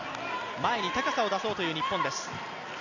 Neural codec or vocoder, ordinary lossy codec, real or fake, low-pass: none; none; real; 7.2 kHz